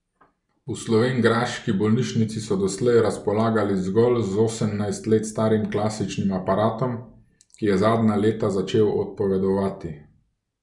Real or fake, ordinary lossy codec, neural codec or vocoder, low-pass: real; none; none; 10.8 kHz